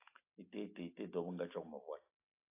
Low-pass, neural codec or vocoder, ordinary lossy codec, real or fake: 3.6 kHz; none; AAC, 24 kbps; real